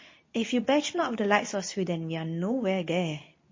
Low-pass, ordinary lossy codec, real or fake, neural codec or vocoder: 7.2 kHz; MP3, 32 kbps; fake; codec, 24 kHz, 0.9 kbps, WavTokenizer, medium speech release version 2